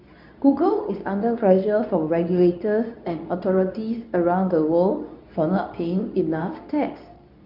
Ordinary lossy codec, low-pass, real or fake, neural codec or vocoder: none; 5.4 kHz; fake; codec, 24 kHz, 0.9 kbps, WavTokenizer, medium speech release version 2